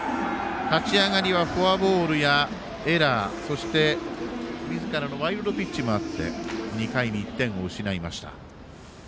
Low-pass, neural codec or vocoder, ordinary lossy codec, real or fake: none; none; none; real